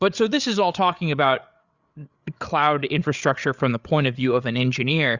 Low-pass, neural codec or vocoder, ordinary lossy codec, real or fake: 7.2 kHz; codec, 16 kHz, 8 kbps, FreqCodec, larger model; Opus, 64 kbps; fake